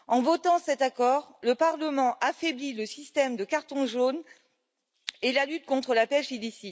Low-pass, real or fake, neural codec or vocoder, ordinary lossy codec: none; real; none; none